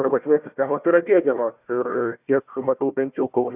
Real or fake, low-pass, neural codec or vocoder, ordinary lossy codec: fake; 3.6 kHz; codec, 16 kHz, 1 kbps, FunCodec, trained on Chinese and English, 50 frames a second; Opus, 64 kbps